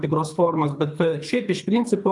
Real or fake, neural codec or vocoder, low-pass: fake; codec, 24 kHz, 3 kbps, HILCodec; 10.8 kHz